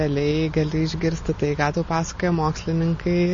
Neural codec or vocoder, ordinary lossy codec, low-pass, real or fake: none; MP3, 32 kbps; 7.2 kHz; real